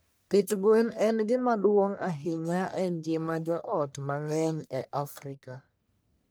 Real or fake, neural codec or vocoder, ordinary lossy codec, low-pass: fake; codec, 44.1 kHz, 1.7 kbps, Pupu-Codec; none; none